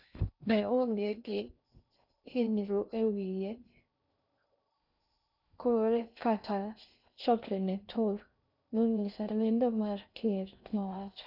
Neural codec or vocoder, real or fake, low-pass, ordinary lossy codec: codec, 16 kHz in and 24 kHz out, 0.6 kbps, FocalCodec, streaming, 2048 codes; fake; 5.4 kHz; none